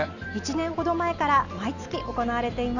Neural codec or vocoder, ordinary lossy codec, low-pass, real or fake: none; none; 7.2 kHz; real